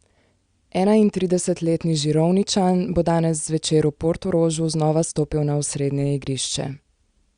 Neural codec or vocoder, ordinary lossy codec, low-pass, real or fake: none; Opus, 64 kbps; 9.9 kHz; real